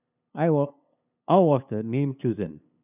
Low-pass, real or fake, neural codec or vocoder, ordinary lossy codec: 3.6 kHz; fake; codec, 16 kHz, 8 kbps, FunCodec, trained on LibriTTS, 25 frames a second; none